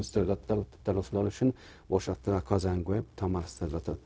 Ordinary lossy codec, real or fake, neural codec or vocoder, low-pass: none; fake; codec, 16 kHz, 0.4 kbps, LongCat-Audio-Codec; none